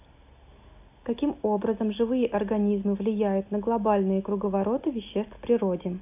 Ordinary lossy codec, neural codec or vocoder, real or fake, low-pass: AAC, 32 kbps; none; real; 3.6 kHz